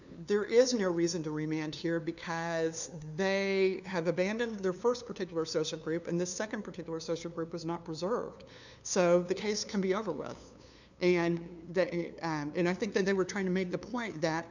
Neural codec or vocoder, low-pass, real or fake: codec, 16 kHz, 2 kbps, FunCodec, trained on LibriTTS, 25 frames a second; 7.2 kHz; fake